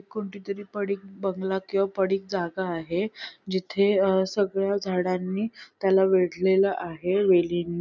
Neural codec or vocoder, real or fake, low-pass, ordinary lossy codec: none; real; 7.2 kHz; none